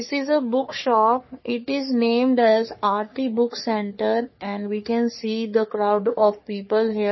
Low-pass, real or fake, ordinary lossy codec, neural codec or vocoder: 7.2 kHz; fake; MP3, 24 kbps; codec, 44.1 kHz, 3.4 kbps, Pupu-Codec